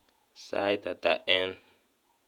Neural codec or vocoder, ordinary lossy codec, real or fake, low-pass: vocoder, 48 kHz, 128 mel bands, Vocos; none; fake; 19.8 kHz